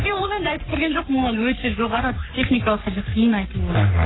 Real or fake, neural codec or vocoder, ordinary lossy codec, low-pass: fake; codec, 44.1 kHz, 3.4 kbps, Pupu-Codec; AAC, 16 kbps; 7.2 kHz